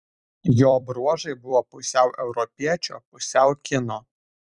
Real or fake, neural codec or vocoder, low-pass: fake; vocoder, 44.1 kHz, 128 mel bands every 256 samples, BigVGAN v2; 10.8 kHz